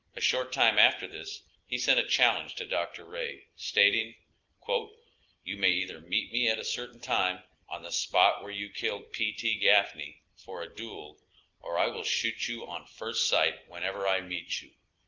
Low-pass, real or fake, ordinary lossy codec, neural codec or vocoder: 7.2 kHz; real; Opus, 32 kbps; none